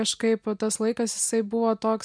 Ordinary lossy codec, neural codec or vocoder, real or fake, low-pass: MP3, 96 kbps; none; real; 9.9 kHz